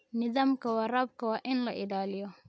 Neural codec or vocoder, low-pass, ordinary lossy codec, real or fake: none; none; none; real